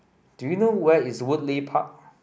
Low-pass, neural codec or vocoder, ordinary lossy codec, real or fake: none; none; none; real